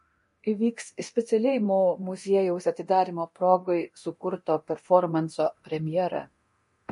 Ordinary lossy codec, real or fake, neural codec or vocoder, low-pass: MP3, 48 kbps; fake; codec, 24 kHz, 0.9 kbps, DualCodec; 10.8 kHz